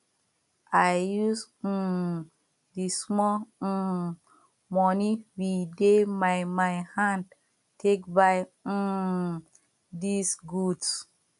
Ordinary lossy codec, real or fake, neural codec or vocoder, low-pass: none; real; none; 10.8 kHz